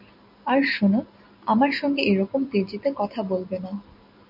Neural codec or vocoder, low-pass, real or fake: none; 5.4 kHz; real